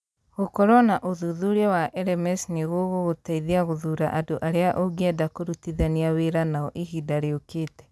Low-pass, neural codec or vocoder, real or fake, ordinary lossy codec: none; none; real; none